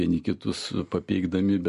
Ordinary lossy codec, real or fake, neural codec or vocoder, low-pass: MP3, 48 kbps; real; none; 14.4 kHz